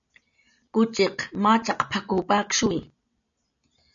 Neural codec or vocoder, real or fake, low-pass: none; real; 7.2 kHz